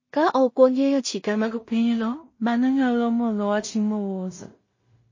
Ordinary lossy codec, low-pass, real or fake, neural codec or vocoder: MP3, 32 kbps; 7.2 kHz; fake; codec, 16 kHz in and 24 kHz out, 0.4 kbps, LongCat-Audio-Codec, two codebook decoder